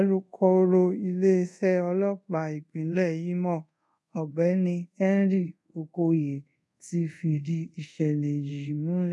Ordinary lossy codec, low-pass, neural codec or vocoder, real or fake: none; none; codec, 24 kHz, 0.5 kbps, DualCodec; fake